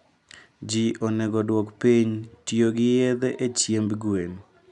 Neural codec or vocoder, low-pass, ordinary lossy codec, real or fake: none; 10.8 kHz; none; real